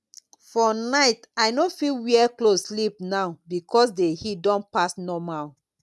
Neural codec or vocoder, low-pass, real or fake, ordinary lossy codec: none; none; real; none